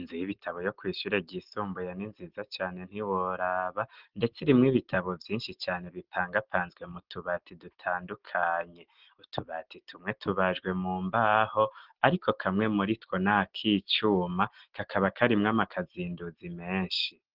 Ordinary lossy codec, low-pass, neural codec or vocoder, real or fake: Opus, 16 kbps; 5.4 kHz; none; real